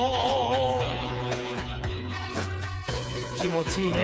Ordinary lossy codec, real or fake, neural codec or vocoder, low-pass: none; fake; codec, 16 kHz, 4 kbps, FreqCodec, smaller model; none